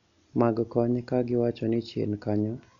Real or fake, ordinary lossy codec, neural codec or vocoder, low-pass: real; none; none; 7.2 kHz